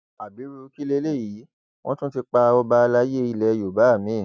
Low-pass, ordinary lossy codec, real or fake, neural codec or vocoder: none; none; real; none